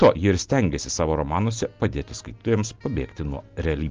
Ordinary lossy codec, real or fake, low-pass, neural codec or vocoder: Opus, 24 kbps; real; 7.2 kHz; none